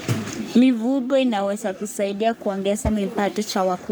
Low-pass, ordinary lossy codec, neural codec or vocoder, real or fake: none; none; codec, 44.1 kHz, 3.4 kbps, Pupu-Codec; fake